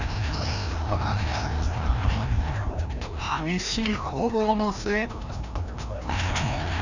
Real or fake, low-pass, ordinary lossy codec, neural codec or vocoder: fake; 7.2 kHz; none; codec, 16 kHz, 1 kbps, FreqCodec, larger model